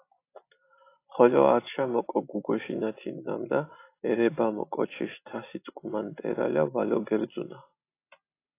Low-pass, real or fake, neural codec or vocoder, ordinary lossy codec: 3.6 kHz; real; none; AAC, 24 kbps